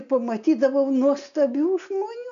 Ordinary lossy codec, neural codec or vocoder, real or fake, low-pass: AAC, 96 kbps; none; real; 7.2 kHz